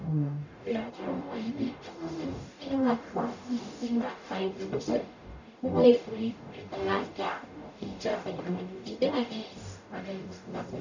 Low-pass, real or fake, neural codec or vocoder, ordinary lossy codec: 7.2 kHz; fake; codec, 44.1 kHz, 0.9 kbps, DAC; none